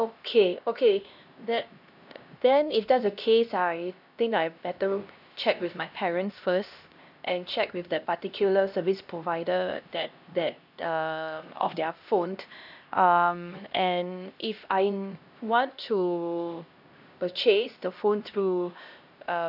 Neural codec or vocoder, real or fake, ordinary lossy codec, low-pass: codec, 16 kHz, 1 kbps, X-Codec, HuBERT features, trained on LibriSpeech; fake; none; 5.4 kHz